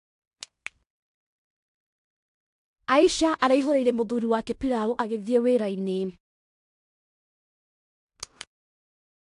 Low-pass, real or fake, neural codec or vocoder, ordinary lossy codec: 10.8 kHz; fake; codec, 16 kHz in and 24 kHz out, 0.9 kbps, LongCat-Audio-Codec, fine tuned four codebook decoder; AAC, 64 kbps